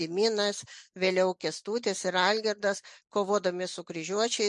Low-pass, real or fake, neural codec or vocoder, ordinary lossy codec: 10.8 kHz; real; none; MP3, 64 kbps